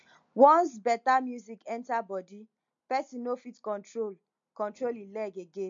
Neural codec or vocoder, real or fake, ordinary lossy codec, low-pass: none; real; MP3, 48 kbps; 7.2 kHz